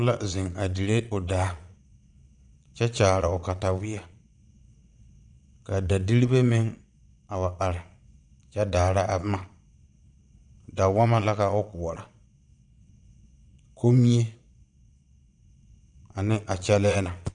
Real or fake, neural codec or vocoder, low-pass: fake; vocoder, 22.05 kHz, 80 mel bands, Vocos; 9.9 kHz